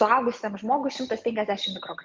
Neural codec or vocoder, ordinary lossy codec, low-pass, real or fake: none; Opus, 32 kbps; 7.2 kHz; real